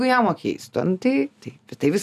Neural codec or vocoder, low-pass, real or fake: none; 14.4 kHz; real